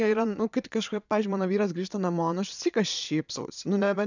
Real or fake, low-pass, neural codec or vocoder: fake; 7.2 kHz; vocoder, 22.05 kHz, 80 mel bands, Vocos